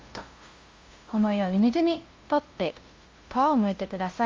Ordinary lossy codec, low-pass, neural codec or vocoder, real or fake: Opus, 32 kbps; 7.2 kHz; codec, 16 kHz, 0.5 kbps, FunCodec, trained on LibriTTS, 25 frames a second; fake